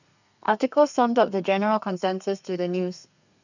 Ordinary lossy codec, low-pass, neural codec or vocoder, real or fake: none; 7.2 kHz; codec, 32 kHz, 1.9 kbps, SNAC; fake